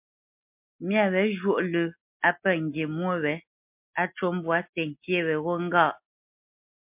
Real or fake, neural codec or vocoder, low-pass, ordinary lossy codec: real; none; 3.6 kHz; MP3, 32 kbps